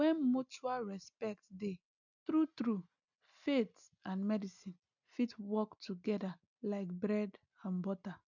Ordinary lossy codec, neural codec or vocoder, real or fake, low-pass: none; none; real; 7.2 kHz